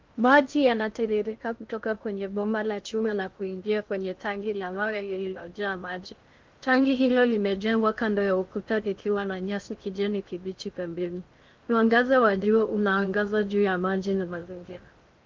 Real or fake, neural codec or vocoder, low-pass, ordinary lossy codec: fake; codec, 16 kHz in and 24 kHz out, 0.6 kbps, FocalCodec, streaming, 2048 codes; 7.2 kHz; Opus, 32 kbps